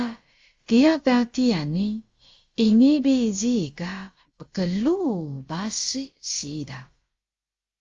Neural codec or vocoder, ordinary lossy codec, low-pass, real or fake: codec, 16 kHz, about 1 kbps, DyCAST, with the encoder's durations; Opus, 32 kbps; 7.2 kHz; fake